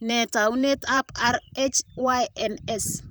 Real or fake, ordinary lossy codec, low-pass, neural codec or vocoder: fake; none; none; vocoder, 44.1 kHz, 128 mel bands, Pupu-Vocoder